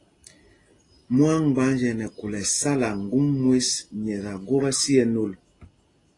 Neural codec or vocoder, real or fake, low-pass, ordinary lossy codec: none; real; 10.8 kHz; AAC, 32 kbps